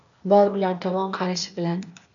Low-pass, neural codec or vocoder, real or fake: 7.2 kHz; codec, 16 kHz, 0.8 kbps, ZipCodec; fake